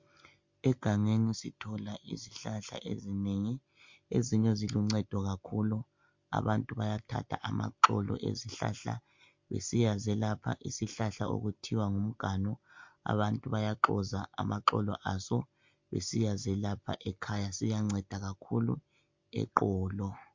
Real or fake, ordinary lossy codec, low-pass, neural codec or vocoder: real; MP3, 48 kbps; 7.2 kHz; none